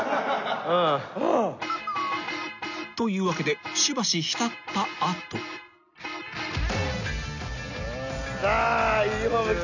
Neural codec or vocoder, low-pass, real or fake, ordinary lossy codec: none; 7.2 kHz; real; none